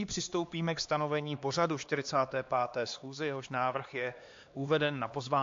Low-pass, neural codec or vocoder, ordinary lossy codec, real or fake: 7.2 kHz; codec, 16 kHz, 2 kbps, X-Codec, HuBERT features, trained on LibriSpeech; AAC, 48 kbps; fake